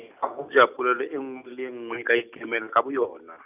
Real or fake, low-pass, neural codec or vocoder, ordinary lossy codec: real; 3.6 kHz; none; none